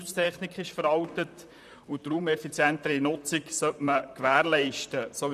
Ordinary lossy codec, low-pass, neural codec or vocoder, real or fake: none; 14.4 kHz; vocoder, 44.1 kHz, 128 mel bands, Pupu-Vocoder; fake